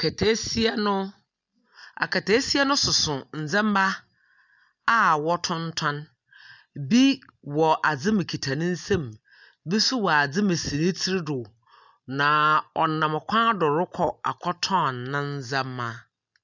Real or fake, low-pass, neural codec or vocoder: real; 7.2 kHz; none